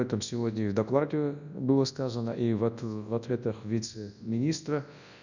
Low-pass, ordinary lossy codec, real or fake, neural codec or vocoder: 7.2 kHz; none; fake; codec, 24 kHz, 0.9 kbps, WavTokenizer, large speech release